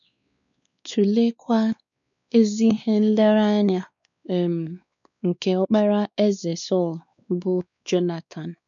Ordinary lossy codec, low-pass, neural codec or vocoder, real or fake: none; 7.2 kHz; codec, 16 kHz, 2 kbps, X-Codec, WavLM features, trained on Multilingual LibriSpeech; fake